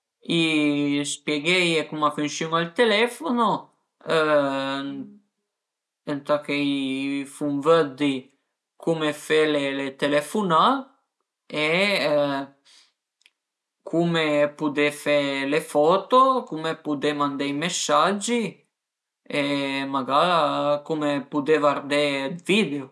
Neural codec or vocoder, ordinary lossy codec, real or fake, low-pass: none; none; real; none